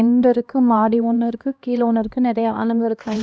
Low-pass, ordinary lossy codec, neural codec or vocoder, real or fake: none; none; codec, 16 kHz, 1 kbps, X-Codec, HuBERT features, trained on LibriSpeech; fake